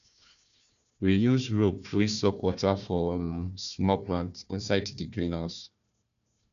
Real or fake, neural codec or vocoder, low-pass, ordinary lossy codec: fake; codec, 16 kHz, 1 kbps, FunCodec, trained on Chinese and English, 50 frames a second; 7.2 kHz; none